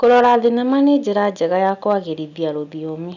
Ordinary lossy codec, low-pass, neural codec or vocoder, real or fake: none; 7.2 kHz; none; real